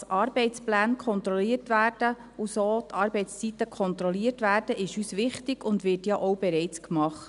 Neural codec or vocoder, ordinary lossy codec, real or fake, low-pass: none; none; real; 10.8 kHz